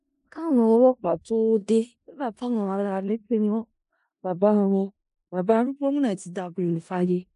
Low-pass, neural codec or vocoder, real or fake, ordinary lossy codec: 10.8 kHz; codec, 16 kHz in and 24 kHz out, 0.4 kbps, LongCat-Audio-Codec, four codebook decoder; fake; AAC, 96 kbps